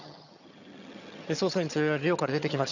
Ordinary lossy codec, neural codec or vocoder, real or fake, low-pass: none; vocoder, 22.05 kHz, 80 mel bands, HiFi-GAN; fake; 7.2 kHz